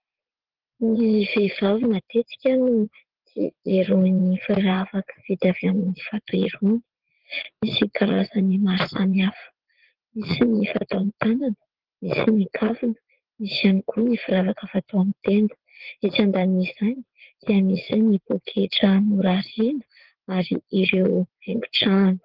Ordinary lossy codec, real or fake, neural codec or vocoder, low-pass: Opus, 16 kbps; fake; vocoder, 44.1 kHz, 80 mel bands, Vocos; 5.4 kHz